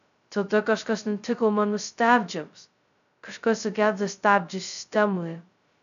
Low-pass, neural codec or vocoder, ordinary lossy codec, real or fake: 7.2 kHz; codec, 16 kHz, 0.2 kbps, FocalCodec; MP3, 96 kbps; fake